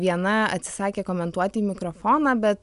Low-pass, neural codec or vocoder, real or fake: 10.8 kHz; none; real